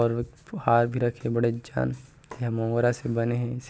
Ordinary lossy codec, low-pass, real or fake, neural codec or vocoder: none; none; real; none